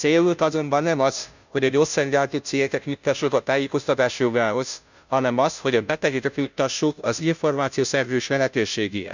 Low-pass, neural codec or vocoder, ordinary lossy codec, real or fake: 7.2 kHz; codec, 16 kHz, 0.5 kbps, FunCodec, trained on Chinese and English, 25 frames a second; none; fake